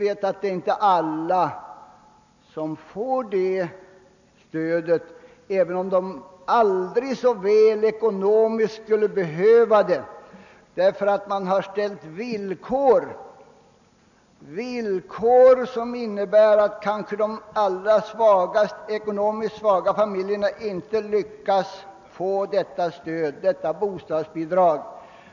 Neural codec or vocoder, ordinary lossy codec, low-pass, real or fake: none; none; 7.2 kHz; real